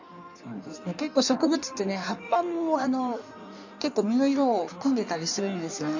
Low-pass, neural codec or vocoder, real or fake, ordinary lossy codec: 7.2 kHz; codec, 16 kHz in and 24 kHz out, 1.1 kbps, FireRedTTS-2 codec; fake; none